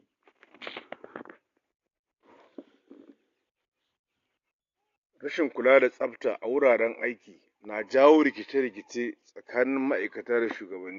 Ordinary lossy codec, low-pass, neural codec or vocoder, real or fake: AAC, 48 kbps; 7.2 kHz; none; real